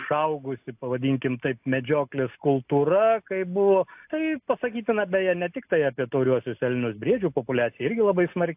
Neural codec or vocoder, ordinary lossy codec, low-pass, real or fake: none; AAC, 32 kbps; 3.6 kHz; real